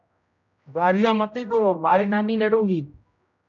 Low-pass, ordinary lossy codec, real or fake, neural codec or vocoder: 7.2 kHz; MP3, 96 kbps; fake; codec, 16 kHz, 0.5 kbps, X-Codec, HuBERT features, trained on general audio